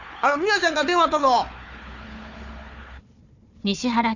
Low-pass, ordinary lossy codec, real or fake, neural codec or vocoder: 7.2 kHz; none; fake; codec, 16 kHz, 4 kbps, FunCodec, trained on Chinese and English, 50 frames a second